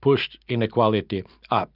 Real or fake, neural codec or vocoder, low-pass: real; none; 5.4 kHz